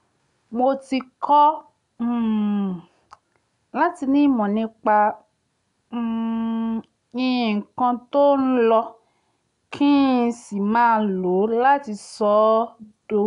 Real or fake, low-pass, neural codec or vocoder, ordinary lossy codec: real; 10.8 kHz; none; none